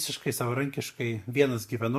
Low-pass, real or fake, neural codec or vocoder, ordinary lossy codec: 14.4 kHz; real; none; MP3, 64 kbps